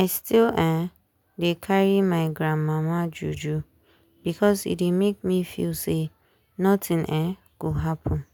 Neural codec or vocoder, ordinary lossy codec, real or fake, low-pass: none; none; real; none